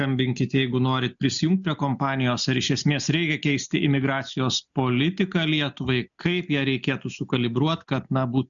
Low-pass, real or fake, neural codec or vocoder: 7.2 kHz; real; none